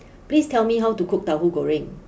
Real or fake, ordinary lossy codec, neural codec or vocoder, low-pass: real; none; none; none